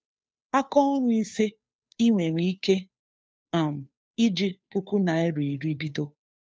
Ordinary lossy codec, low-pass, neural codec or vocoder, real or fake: none; none; codec, 16 kHz, 2 kbps, FunCodec, trained on Chinese and English, 25 frames a second; fake